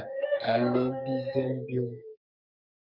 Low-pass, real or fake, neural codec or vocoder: 5.4 kHz; fake; codec, 44.1 kHz, 2.6 kbps, SNAC